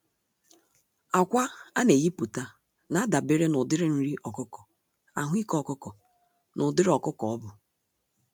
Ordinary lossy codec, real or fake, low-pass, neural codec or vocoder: none; real; none; none